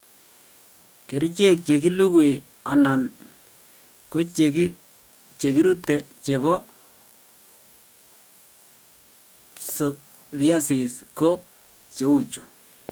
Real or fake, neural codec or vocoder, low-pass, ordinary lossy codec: fake; codec, 44.1 kHz, 2.6 kbps, DAC; none; none